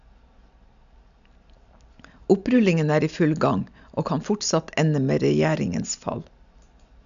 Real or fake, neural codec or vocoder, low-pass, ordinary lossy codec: real; none; 7.2 kHz; none